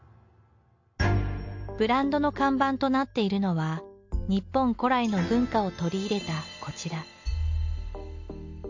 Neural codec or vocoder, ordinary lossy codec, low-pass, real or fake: none; MP3, 48 kbps; 7.2 kHz; real